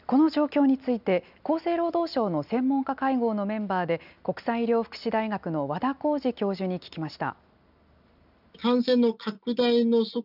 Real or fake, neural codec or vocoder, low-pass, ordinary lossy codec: real; none; 5.4 kHz; none